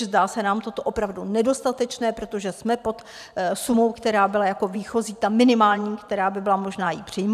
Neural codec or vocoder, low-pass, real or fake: vocoder, 44.1 kHz, 128 mel bands every 512 samples, BigVGAN v2; 14.4 kHz; fake